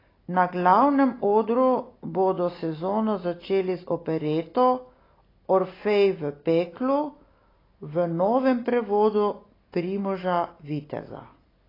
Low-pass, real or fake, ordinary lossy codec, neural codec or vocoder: 5.4 kHz; real; AAC, 24 kbps; none